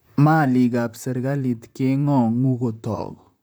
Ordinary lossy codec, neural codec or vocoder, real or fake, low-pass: none; vocoder, 44.1 kHz, 128 mel bands, Pupu-Vocoder; fake; none